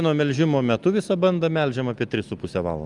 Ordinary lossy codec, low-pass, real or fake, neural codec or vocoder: Opus, 32 kbps; 10.8 kHz; real; none